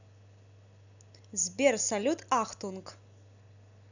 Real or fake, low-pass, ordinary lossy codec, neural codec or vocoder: real; 7.2 kHz; none; none